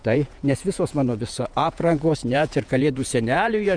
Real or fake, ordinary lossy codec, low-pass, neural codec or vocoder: fake; MP3, 96 kbps; 9.9 kHz; vocoder, 48 kHz, 128 mel bands, Vocos